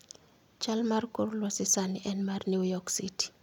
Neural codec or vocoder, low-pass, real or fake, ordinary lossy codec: none; 19.8 kHz; real; none